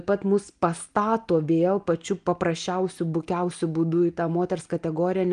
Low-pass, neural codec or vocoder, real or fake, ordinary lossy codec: 9.9 kHz; none; real; Opus, 24 kbps